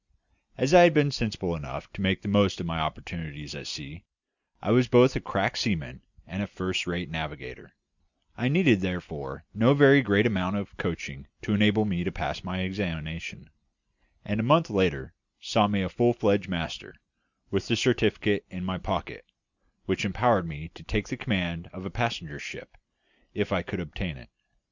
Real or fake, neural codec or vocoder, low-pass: real; none; 7.2 kHz